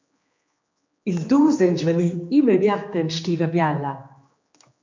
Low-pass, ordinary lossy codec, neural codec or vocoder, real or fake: 7.2 kHz; MP3, 48 kbps; codec, 16 kHz, 2 kbps, X-Codec, HuBERT features, trained on general audio; fake